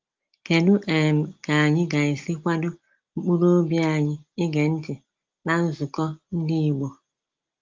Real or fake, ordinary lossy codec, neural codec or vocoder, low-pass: real; Opus, 32 kbps; none; 7.2 kHz